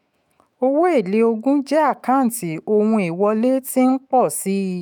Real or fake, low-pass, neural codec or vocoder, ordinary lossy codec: fake; none; autoencoder, 48 kHz, 128 numbers a frame, DAC-VAE, trained on Japanese speech; none